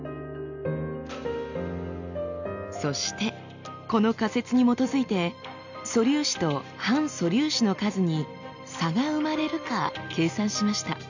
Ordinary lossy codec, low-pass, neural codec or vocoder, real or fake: none; 7.2 kHz; none; real